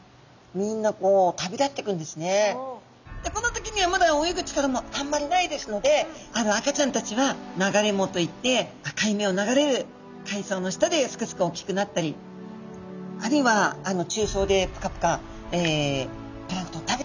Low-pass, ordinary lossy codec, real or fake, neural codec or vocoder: 7.2 kHz; none; real; none